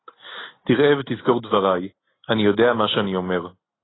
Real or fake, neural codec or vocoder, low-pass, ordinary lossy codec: real; none; 7.2 kHz; AAC, 16 kbps